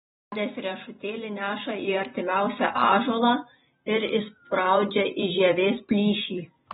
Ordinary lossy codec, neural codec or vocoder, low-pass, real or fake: AAC, 16 kbps; vocoder, 44.1 kHz, 128 mel bands every 256 samples, BigVGAN v2; 19.8 kHz; fake